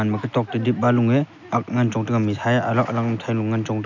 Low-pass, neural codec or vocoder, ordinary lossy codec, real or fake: 7.2 kHz; none; none; real